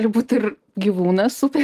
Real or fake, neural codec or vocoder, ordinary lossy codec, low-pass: real; none; Opus, 24 kbps; 14.4 kHz